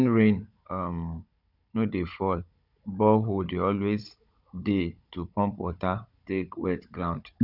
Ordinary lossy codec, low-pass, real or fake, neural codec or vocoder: none; 5.4 kHz; fake; codec, 16 kHz, 16 kbps, FunCodec, trained on Chinese and English, 50 frames a second